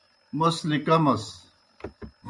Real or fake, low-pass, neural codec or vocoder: real; 10.8 kHz; none